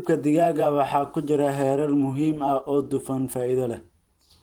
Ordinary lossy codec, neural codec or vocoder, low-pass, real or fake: Opus, 24 kbps; vocoder, 44.1 kHz, 128 mel bands every 512 samples, BigVGAN v2; 19.8 kHz; fake